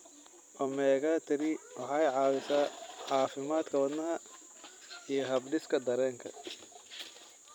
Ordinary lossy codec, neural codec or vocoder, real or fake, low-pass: none; vocoder, 44.1 kHz, 128 mel bands every 256 samples, BigVGAN v2; fake; 19.8 kHz